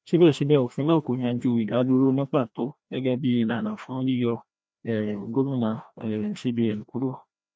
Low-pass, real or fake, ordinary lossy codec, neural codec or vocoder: none; fake; none; codec, 16 kHz, 1 kbps, FreqCodec, larger model